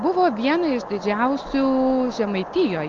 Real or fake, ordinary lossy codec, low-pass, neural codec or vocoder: real; Opus, 32 kbps; 7.2 kHz; none